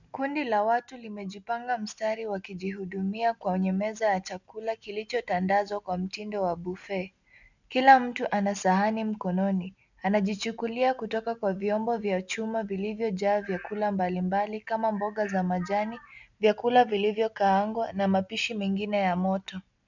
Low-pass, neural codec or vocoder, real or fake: 7.2 kHz; none; real